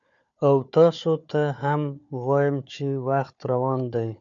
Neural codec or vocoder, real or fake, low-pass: codec, 16 kHz, 4 kbps, FunCodec, trained on Chinese and English, 50 frames a second; fake; 7.2 kHz